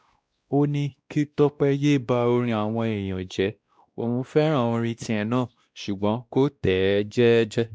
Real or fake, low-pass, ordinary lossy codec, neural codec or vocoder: fake; none; none; codec, 16 kHz, 1 kbps, X-Codec, WavLM features, trained on Multilingual LibriSpeech